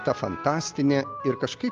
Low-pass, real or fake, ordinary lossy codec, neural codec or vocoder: 7.2 kHz; real; Opus, 24 kbps; none